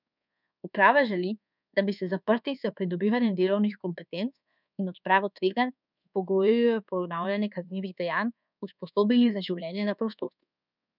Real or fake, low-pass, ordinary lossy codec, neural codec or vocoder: fake; 5.4 kHz; none; codec, 24 kHz, 1.2 kbps, DualCodec